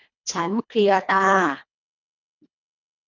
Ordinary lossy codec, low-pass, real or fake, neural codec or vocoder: none; 7.2 kHz; fake; codec, 24 kHz, 1.5 kbps, HILCodec